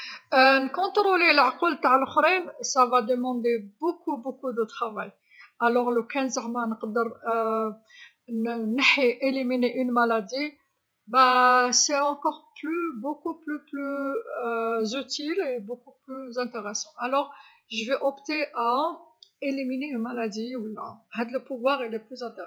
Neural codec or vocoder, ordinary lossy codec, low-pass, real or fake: vocoder, 48 kHz, 128 mel bands, Vocos; none; none; fake